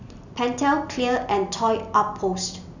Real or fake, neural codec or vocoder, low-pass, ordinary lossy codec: real; none; 7.2 kHz; none